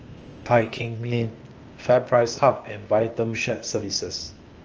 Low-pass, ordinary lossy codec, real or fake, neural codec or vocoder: 7.2 kHz; Opus, 24 kbps; fake; codec, 16 kHz, 0.8 kbps, ZipCodec